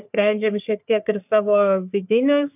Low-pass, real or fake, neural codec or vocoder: 3.6 kHz; fake; codec, 44.1 kHz, 3.4 kbps, Pupu-Codec